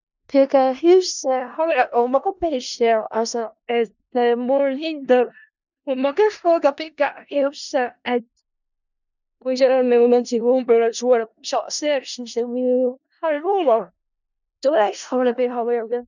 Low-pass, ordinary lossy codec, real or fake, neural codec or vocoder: 7.2 kHz; none; fake; codec, 16 kHz in and 24 kHz out, 0.4 kbps, LongCat-Audio-Codec, four codebook decoder